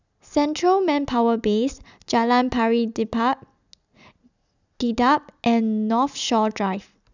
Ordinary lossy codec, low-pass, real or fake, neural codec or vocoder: none; 7.2 kHz; real; none